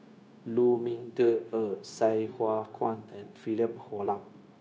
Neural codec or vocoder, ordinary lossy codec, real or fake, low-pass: codec, 16 kHz, 0.9 kbps, LongCat-Audio-Codec; none; fake; none